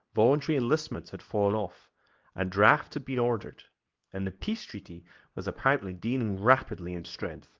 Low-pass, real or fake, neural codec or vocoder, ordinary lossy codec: 7.2 kHz; fake; codec, 24 kHz, 0.9 kbps, WavTokenizer, medium speech release version 2; Opus, 32 kbps